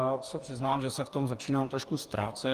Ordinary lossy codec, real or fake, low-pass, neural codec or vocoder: Opus, 24 kbps; fake; 14.4 kHz; codec, 44.1 kHz, 2.6 kbps, DAC